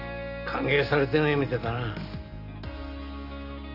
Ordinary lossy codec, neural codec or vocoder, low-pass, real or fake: none; none; 5.4 kHz; real